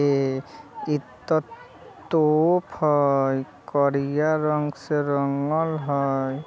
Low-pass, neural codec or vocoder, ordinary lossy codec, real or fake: none; none; none; real